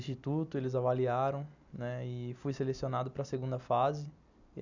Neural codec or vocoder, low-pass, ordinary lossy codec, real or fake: none; 7.2 kHz; none; real